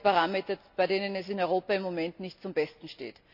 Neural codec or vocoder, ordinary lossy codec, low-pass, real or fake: none; none; 5.4 kHz; real